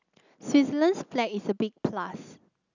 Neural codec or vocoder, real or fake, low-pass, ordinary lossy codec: none; real; 7.2 kHz; none